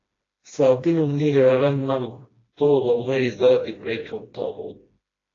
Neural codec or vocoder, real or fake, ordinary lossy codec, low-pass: codec, 16 kHz, 1 kbps, FreqCodec, smaller model; fake; AAC, 32 kbps; 7.2 kHz